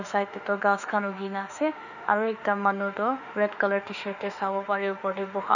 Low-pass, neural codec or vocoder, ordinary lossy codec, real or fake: 7.2 kHz; autoencoder, 48 kHz, 32 numbers a frame, DAC-VAE, trained on Japanese speech; none; fake